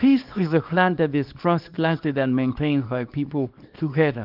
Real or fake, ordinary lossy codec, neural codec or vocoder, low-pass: fake; Opus, 32 kbps; codec, 24 kHz, 0.9 kbps, WavTokenizer, small release; 5.4 kHz